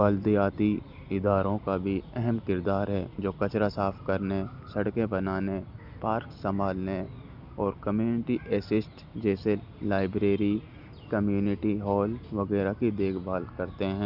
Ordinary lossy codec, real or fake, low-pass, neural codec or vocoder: none; real; 5.4 kHz; none